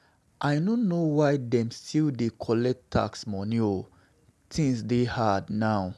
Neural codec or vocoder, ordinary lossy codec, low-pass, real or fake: none; none; none; real